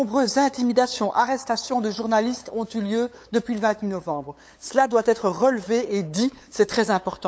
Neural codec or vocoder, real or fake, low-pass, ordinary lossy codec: codec, 16 kHz, 8 kbps, FunCodec, trained on LibriTTS, 25 frames a second; fake; none; none